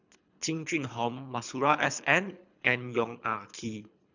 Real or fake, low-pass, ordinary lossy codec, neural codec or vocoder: fake; 7.2 kHz; none; codec, 24 kHz, 3 kbps, HILCodec